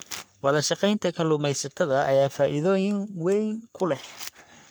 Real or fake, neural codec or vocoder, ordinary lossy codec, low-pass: fake; codec, 44.1 kHz, 3.4 kbps, Pupu-Codec; none; none